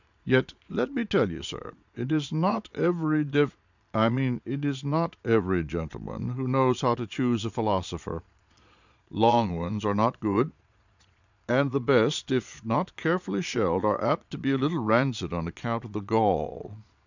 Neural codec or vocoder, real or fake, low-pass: vocoder, 22.05 kHz, 80 mel bands, Vocos; fake; 7.2 kHz